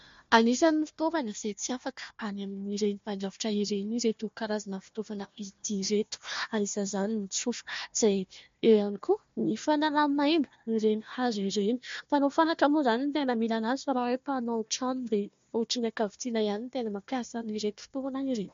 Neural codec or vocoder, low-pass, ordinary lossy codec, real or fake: codec, 16 kHz, 1 kbps, FunCodec, trained on Chinese and English, 50 frames a second; 7.2 kHz; MP3, 48 kbps; fake